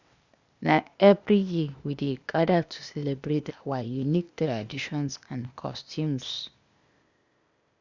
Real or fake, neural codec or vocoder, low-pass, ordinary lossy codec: fake; codec, 16 kHz, 0.8 kbps, ZipCodec; 7.2 kHz; Opus, 64 kbps